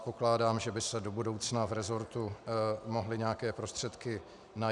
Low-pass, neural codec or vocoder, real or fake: 10.8 kHz; none; real